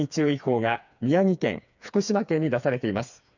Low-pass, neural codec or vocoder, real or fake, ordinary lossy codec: 7.2 kHz; codec, 16 kHz, 4 kbps, FreqCodec, smaller model; fake; none